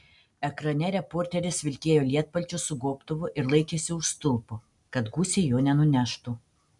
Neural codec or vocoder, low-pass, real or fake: none; 10.8 kHz; real